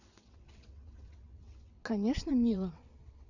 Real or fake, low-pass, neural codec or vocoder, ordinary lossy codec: fake; 7.2 kHz; vocoder, 22.05 kHz, 80 mel bands, WaveNeXt; none